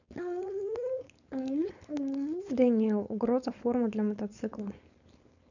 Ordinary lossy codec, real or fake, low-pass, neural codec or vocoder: none; fake; 7.2 kHz; codec, 16 kHz, 4.8 kbps, FACodec